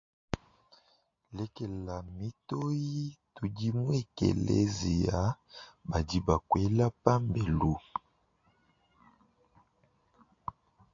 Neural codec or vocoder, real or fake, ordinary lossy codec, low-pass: none; real; AAC, 48 kbps; 7.2 kHz